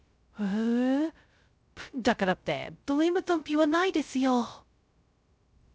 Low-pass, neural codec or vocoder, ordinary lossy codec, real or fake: none; codec, 16 kHz, 0.2 kbps, FocalCodec; none; fake